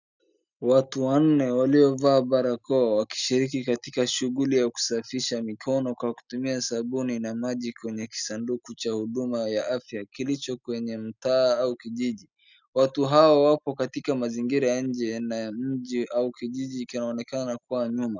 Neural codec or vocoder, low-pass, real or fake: none; 7.2 kHz; real